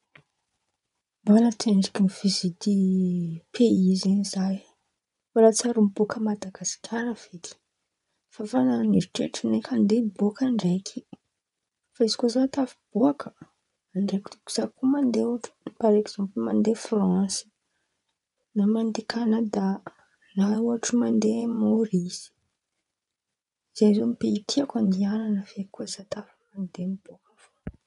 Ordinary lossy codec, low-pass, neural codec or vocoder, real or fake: none; 10.8 kHz; vocoder, 24 kHz, 100 mel bands, Vocos; fake